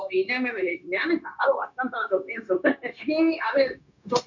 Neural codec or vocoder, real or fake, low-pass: codec, 16 kHz, 0.9 kbps, LongCat-Audio-Codec; fake; 7.2 kHz